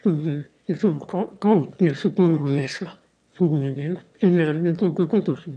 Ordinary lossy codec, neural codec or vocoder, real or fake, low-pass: none; autoencoder, 22.05 kHz, a latent of 192 numbers a frame, VITS, trained on one speaker; fake; 9.9 kHz